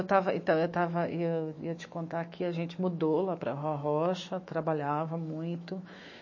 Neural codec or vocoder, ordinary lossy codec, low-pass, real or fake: autoencoder, 48 kHz, 128 numbers a frame, DAC-VAE, trained on Japanese speech; MP3, 32 kbps; 7.2 kHz; fake